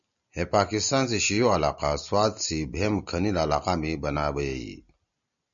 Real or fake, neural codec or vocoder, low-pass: real; none; 7.2 kHz